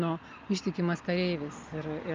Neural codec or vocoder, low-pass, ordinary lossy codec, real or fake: none; 7.2 kHz; Opus, 32 kbps; real